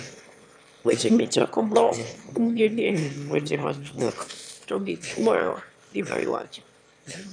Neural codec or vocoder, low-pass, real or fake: autoencoder, 22.05 kHz, a latent of 192 numbers a frame, VITS, trained on one speaker; 9.9 kHz; fake